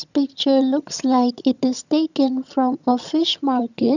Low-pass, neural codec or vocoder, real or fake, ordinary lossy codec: 7.2 kHz; vocoder, 22.05 kHz, 80 mel bands, HiFi-GAN; fake; none